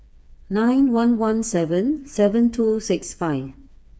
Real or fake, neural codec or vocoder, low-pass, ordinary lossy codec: fake; codec, 16 kHz, 4 kbps, FreqCodec, smaller model; none; none